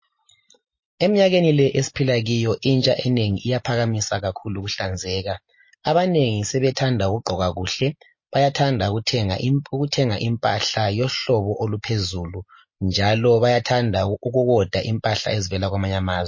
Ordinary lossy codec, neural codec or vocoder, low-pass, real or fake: MP3, 32 kbps; none; 7.2 kHz; real